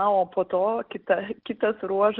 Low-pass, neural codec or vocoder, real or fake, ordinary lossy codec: 5.4 kHz; none; real; Opus, 16 kbps